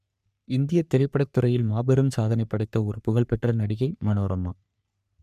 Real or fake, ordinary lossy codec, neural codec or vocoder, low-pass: fake; none; codec, 44.1 kHz, 3.4 kbps, Pupu-Codec; 14.4 kHz